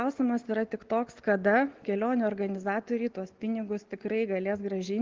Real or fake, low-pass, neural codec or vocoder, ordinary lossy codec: real; 7.2 kHz; none; Opus, 16 kbps